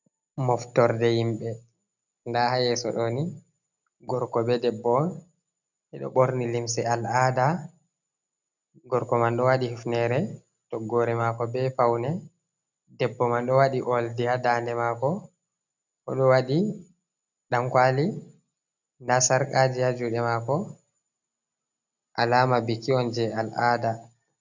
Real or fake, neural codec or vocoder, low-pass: real; none; 7.2 kHz